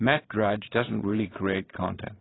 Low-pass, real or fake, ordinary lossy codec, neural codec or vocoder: 7.2 kHz; fake; AAC, 16 kbps; vocoder, 22.05 kHz, 80 mel bands, Vocos